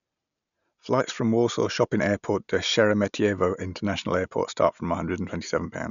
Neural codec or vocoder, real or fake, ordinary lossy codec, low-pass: none; real; none; 7.2 kHz